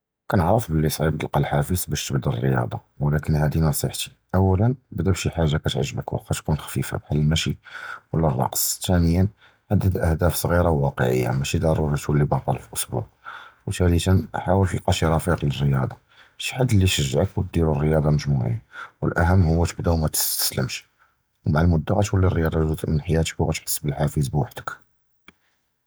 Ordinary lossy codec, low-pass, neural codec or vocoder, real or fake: none; none; none; real